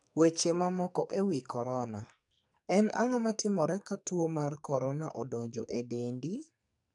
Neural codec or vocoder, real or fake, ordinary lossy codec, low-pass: codec, 44.1 kHz, 2.6 kbps, SNAC; fake; none; 10.8 kHz